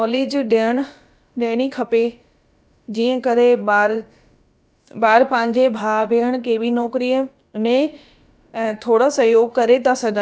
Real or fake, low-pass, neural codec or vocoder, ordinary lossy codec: fake; none; codec, 16 kHz, about 1 kbps, DyCAST, with the encoder's durations; none